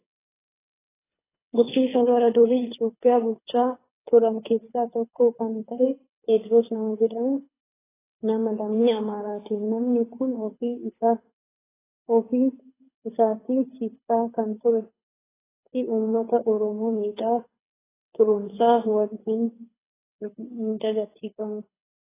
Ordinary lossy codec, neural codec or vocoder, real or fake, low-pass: AAC, 16 kbps; codec, 24 kHz, 6 kbps, HILCodec; fake; 3.6 kHz